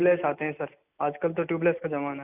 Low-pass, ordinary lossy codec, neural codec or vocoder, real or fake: 3.6 kHz; none; none; real